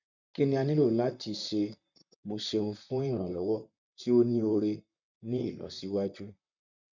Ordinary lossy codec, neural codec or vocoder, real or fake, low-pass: none; vocoder, 44.1 kHz, 128 mel bands, Pupu-Vocoder; fake; 7.2 kHz